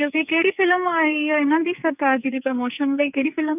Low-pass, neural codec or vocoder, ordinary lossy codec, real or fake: 3.6 kHz; codec, 44.1 kHz, 2.6 kbps, SNAC; none; fake